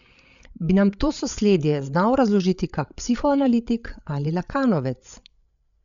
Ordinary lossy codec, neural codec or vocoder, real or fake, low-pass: none; codec, 16 kHz, 8 kbps, FreqCodec, larger model; fake; 7.2 kHz